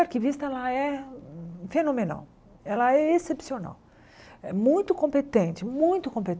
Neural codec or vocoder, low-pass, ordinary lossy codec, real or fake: none; none; none; real